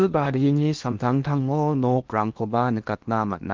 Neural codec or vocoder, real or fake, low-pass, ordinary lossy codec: codec, 16 kHz in and 24 kHz out, 0.8 kbps, FocalCodec, streaming, 65536 codes; fake; 7.2 kHz; Opus, 32 kbps